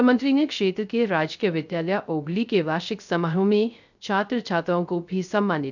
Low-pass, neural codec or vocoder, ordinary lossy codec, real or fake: 7.2 kHz; codec, 16 kHz, 0.3 kbps, FocalCodec; none; fake